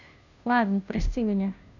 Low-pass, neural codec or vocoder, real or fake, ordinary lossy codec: 7.2 kHz; codec, 16 kHz, 0.5 kbps, FunCodec, trained on Chinese and English, 25 frames a second; fake; none